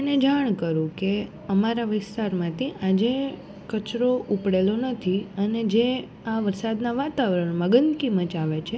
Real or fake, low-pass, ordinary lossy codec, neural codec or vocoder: real; none; none; none